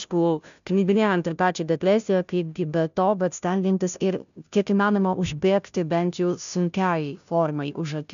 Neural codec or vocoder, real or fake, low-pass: codec, 16 kHz, 0.5 kbps, FunCodec, trained on Chinese and English, 25 frames a second; fake; 7.2 kHz